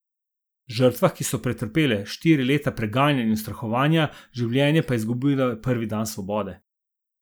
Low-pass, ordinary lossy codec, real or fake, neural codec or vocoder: none; none; real; none